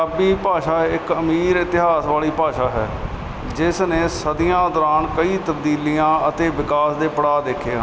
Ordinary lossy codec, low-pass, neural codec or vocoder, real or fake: none; none; none; real